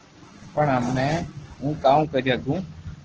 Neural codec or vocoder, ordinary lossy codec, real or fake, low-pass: codec, 44.1 kHz, 7.8 kbps, Pupu-Codec; Opus, 16 kbps; fake; 7.2 kHz